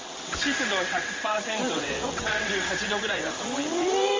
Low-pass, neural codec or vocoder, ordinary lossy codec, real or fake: 7.2 kHz; vocoder, 22.05 kHz, 80 mel bands, WaveNeXt; Opus, 32 kbps; fake